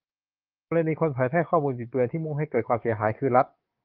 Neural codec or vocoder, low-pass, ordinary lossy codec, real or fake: codec, 44.1 kHz, 7.8 kbps, DAC; 5.4 kHz; Opus, 32 kbps; fake